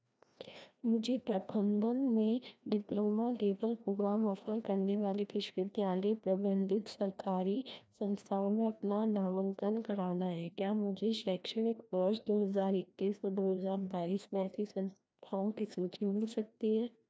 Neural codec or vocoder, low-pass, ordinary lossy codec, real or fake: codec, 16 kHz, 1 kbps, FreqCodec, larger model; none; none; fake